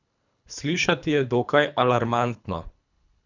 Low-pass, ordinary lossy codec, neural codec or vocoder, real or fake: 7.2 kHz; none; codec, 24 kHz, 3 kbps, HILCodec; fake